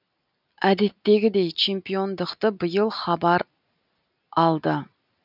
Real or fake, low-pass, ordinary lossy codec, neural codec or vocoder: real; 5.4 kHz; none; none